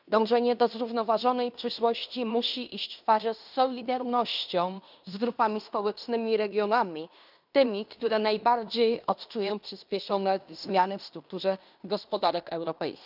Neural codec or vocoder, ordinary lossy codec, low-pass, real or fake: codec, 16 kHz in and 24 kHz out, 0.9 kbps, LongCat-Audio-Codec, fine tuned four codebook decoder; none; 5.4 kHz; fake